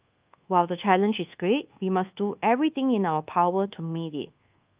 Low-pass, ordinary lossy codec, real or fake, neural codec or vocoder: 3.6 kHz; Opus, 32 kbps; fake; codec, 24 kHz, 1.2 kbps, DualCodec